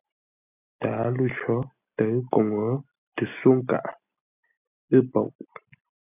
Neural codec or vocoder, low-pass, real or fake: none; 3.6 kHz; real